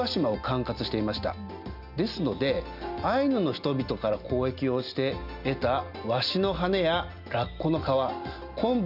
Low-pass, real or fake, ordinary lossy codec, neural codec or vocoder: 5.4 kHz; real; none; none